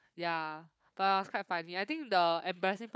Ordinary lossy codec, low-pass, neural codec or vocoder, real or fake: none; none; codec, 16 kHz, 4 kbps, FunCodec, trained on Chinese and English, 50 frames a second; fake